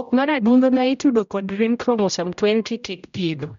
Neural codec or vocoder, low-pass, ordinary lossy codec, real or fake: codec, 16 kHz, 0.5 kbps, X-Codec, HuBERT features, trained on general audio; 7.2 kHz; MP3, 64 kbps; fake